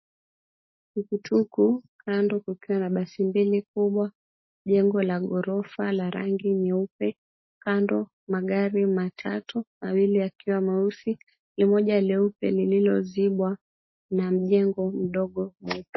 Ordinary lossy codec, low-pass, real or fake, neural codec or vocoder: MP3, 24 kbps; 7.2 kHz; real; none